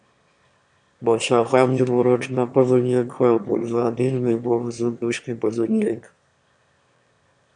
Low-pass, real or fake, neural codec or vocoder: 9.9 kHz; fake; autoencoder, 22.05 kHz, a latent of 192 numbers a frame, VITS, trained on one speaker